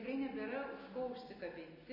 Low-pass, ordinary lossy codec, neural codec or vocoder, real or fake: 5.4 kHz; MP3, 24 kbps; none; real